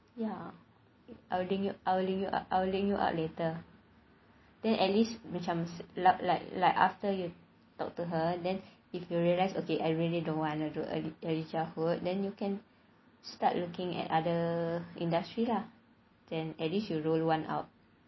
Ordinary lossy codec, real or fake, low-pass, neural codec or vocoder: MP3, 24 kbps; real; 7.2 kHz; none